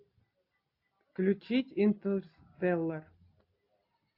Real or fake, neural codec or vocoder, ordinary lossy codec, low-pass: real; none; AAC, 48 kbps; 5.4 kHz